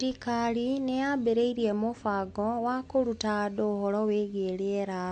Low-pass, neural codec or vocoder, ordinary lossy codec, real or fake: 9.9 kHz; none; AAC, 48 kbps; real